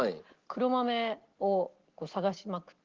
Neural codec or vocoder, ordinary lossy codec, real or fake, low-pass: none; Opus, 16 kbps; real; 7.2 kHz